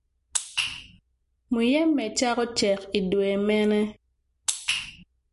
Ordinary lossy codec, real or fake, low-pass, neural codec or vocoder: MP3, 48 kbps; real; 14.4 kHz; none